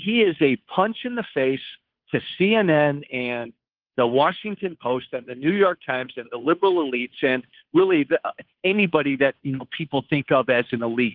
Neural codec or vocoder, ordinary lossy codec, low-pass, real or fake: codec, 16 kHz, 2 kbps, FunCodec, trained on Chinese and English, 25 frames a second; Opus, 32 kbps; 5.4 kHz; fake